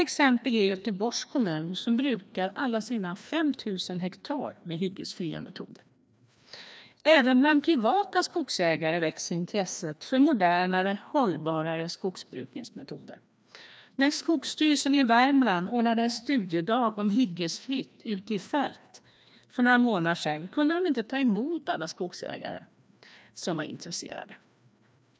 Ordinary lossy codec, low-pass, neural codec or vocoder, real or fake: none; none; codec, 16 kHz, 1 kbps, FreqCodec, larger model; fake